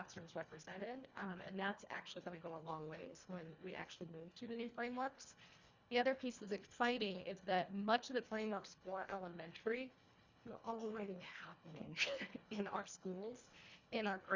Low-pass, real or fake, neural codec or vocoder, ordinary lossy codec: 7.2 kHz; fake; codec, 24 kHz, 1.5 kbps, HILCodec; Opus, 64 kbps